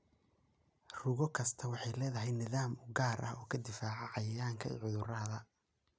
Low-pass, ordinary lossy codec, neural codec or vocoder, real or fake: none; none; none; real